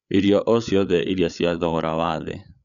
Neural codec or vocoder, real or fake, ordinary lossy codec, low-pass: codec, 16 kHz, 8 kbps, FreqCodec, larger model; fake; none; 7.2 kHz